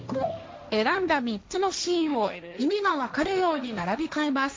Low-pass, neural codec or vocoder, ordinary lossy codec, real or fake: none; codec, 16 kHz, 1.1 kbps, Voila-Tokenizer; none; fake